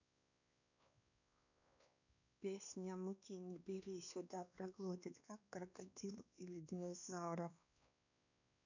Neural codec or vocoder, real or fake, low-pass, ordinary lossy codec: codec, 16 kHz, 2 kbps, X-Codec, WavLM features, trained on Multilingual LibriSpeech; fake; 7.2 kHz; none